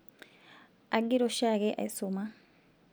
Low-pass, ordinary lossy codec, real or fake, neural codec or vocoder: none; none; real; none